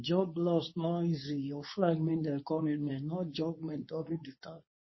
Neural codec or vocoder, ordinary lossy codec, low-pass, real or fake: codec, 24 kHz, 0.9 kbps, WavTokenizer, medium speech release version 2; MP3, 24 kbps; 7.2 kHz; fake